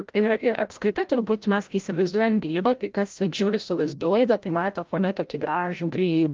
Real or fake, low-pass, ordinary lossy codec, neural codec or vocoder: fake; 7.2 kHz; Opus, 32 kbps; codec, 16 kHz, 0.5 kbps, FreqCodec, larger model